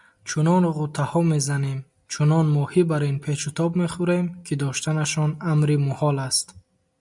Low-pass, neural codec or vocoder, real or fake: 10.8 kHz; none; real